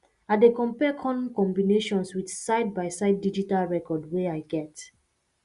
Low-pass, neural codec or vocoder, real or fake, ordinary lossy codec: 10.8 kHz; none; real; Opus, 64 kbps